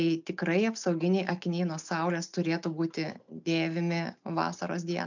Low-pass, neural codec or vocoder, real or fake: 7.2 kHz; none; real